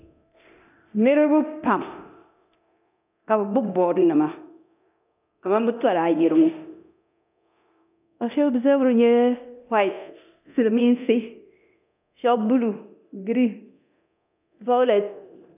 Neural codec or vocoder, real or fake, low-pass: codec, 24 kHz, 0.9 kbps, DualCodec; fake; 3.6 kHz